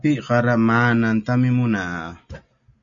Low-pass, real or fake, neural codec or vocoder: 7.2 kHz; real; none